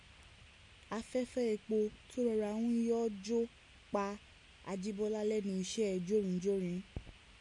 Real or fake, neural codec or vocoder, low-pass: real; none; 10.8 kHz